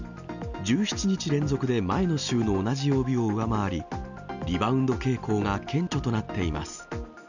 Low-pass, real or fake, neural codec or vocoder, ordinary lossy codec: 7.2 kHz; real; none; none